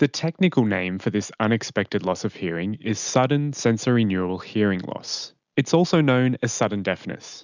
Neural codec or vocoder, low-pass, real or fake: none; 7.2 kHz; real